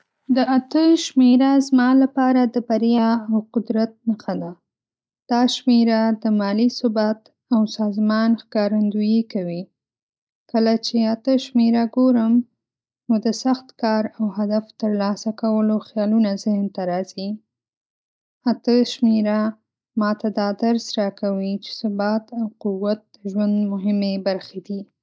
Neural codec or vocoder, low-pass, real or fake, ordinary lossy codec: none; none; real; none